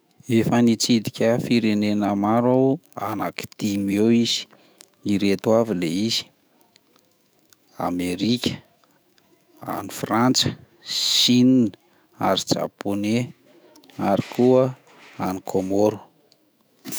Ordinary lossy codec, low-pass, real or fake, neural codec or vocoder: none; none; fake; autoencoder, 48 kHz, 128 numbers a frame, DAC-VAE, trained on Japanese speech